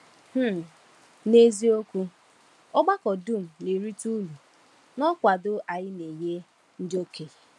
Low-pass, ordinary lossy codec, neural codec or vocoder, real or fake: none; none; none; real